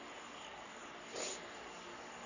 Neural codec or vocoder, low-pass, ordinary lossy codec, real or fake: codec, 44.1 kHz, 3.4 kbps, Pupu-Codec; 7.2 kHz; none; fake